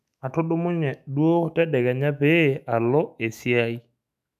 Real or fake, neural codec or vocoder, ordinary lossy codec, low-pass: fake; autoencoder, 48 kHz, 128 numbers a frame, DAC-VAE, trained on Japanese speech; none; 14.4 kHz